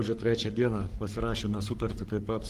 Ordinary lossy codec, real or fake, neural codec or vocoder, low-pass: Opus, 24 kbps; fake; codec, 44.1 kHz, 3.4 kbps, Pupu-Codec; 14.4 kHz